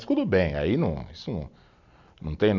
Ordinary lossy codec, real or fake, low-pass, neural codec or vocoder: none; real; 7.2 kHz; none